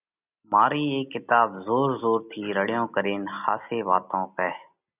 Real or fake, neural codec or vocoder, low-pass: real; none; 3.6 kHz